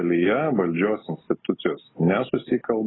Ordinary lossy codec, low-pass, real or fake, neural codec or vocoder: AAC, 16 kbps; 7.2 kHz; real; none